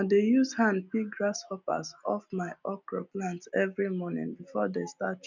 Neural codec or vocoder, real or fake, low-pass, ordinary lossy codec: none; real; 7.2 kHz; none